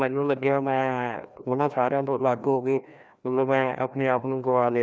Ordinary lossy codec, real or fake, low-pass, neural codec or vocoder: none; fake; none; codec, 16 kHz, 1 kbps, FreqCodec, larger model